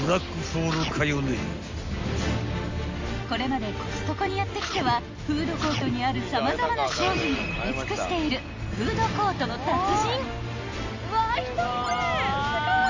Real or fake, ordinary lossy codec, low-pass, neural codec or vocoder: real; MP3, 48 kbps; 7.2 kHz; none